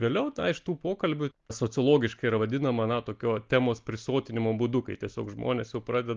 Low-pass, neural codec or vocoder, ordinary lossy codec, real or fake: 7.2 kHz; none; Opus, 32 kbps; real